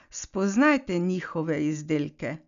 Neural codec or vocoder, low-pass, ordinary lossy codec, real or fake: none; 7.2 kHz; none; real